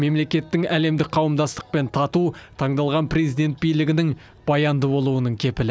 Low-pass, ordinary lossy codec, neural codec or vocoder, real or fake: none; none; none; real